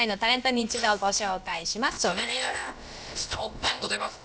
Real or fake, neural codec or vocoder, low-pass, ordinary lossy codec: fake; codec, 16 kHz, about 1 kbps, DyCAST, with the encoder's durations; none; none